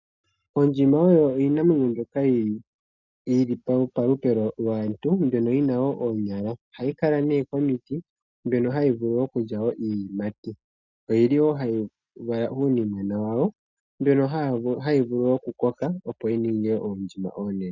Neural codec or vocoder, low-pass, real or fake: none; 7.2 kHz; real